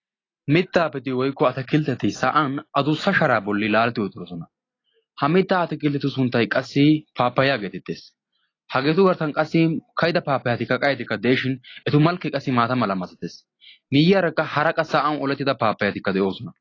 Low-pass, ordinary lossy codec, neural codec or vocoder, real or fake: 7.2 kHz; AAC, 32 kbps; none; real